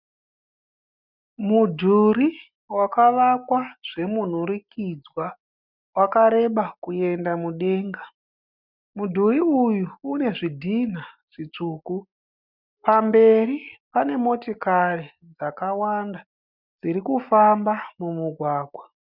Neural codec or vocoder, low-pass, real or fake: none; 5.4 kHz; real